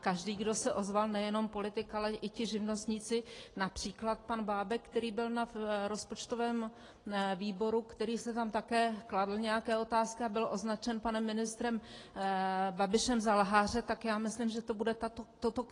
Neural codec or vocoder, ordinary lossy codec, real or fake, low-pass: none; AAC, 32 kbps; real; 10.8 kHz